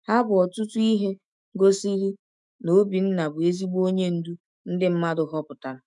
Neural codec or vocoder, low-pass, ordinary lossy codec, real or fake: autoencoder, 48 kHz, 128 numbers a frame, DAC-VAE, trained on Japanese speech; 10.8 kHz; none; fake